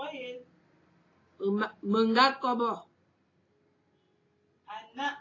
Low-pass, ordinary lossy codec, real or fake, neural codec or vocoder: 7.2 kHz; AAC, 32 kbps; real; none